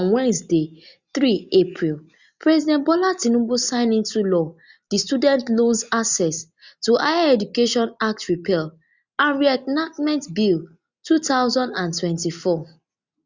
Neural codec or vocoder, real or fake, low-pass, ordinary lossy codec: none; real; 7.2 kHz; Opus, 64 kbps